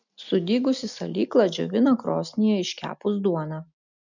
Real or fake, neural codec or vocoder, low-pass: real; none; 7.2 kHz